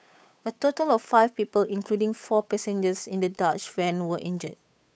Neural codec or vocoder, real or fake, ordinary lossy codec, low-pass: codec, 16 kHz, 8 kbps, FunCodec, trained on Chinese and English, 25 frames a second; fake; none; none